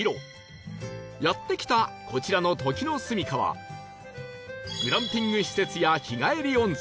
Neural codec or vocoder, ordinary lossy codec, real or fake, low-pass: none; none; real; none